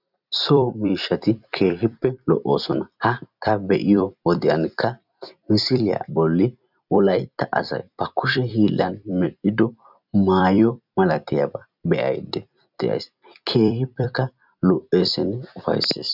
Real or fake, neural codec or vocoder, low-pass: fake; vocoder, 44.1 kHz, 128 mel bands every 256 samples, BigVGAN v2; 5.4 kHz